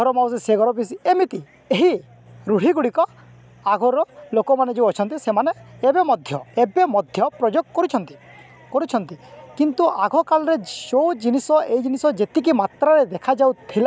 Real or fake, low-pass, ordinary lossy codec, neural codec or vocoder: real; none; none; none